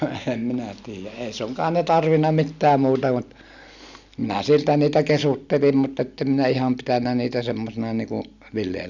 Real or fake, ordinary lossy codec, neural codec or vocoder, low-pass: real; none; none; 7.2 kHz